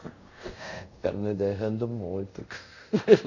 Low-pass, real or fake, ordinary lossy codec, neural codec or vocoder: 7.2 kHz; fake; none; codec, 24 kHz, 0.5 kbps, DualCodec